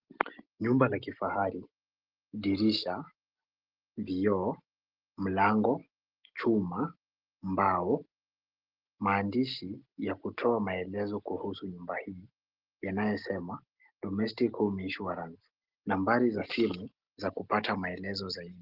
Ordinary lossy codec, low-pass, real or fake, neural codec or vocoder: Opus, 16 kbps; 5.4 kHz; real; none